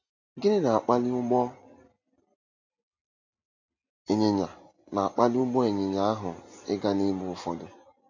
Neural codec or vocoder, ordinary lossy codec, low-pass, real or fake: none; AAC, 48 kbps; 7.2 kHz; real